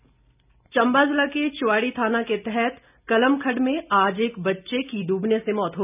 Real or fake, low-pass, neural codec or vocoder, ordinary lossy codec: real; 3.6 kHz; none; none